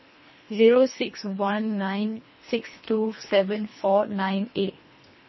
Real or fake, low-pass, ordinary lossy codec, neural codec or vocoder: fake; 7.2 kHz; MP3, 24 kbps; codec, 24 kHz, 1.5 kbps, HILCodec